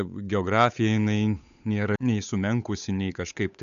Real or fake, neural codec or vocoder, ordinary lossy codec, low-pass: fake; codec, 16 kHz, 8 kbps, FunCodec, trained on Chinese and English, 25 frames a second; AAC, 96 kbps; 7.2 kHz